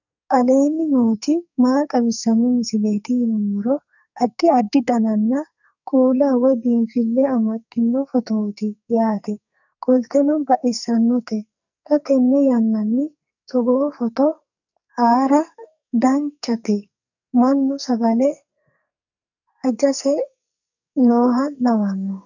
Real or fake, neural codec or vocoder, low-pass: fake; codec, 44.1 kHz, 2.6 kbps, SNAC; 7.2 kHz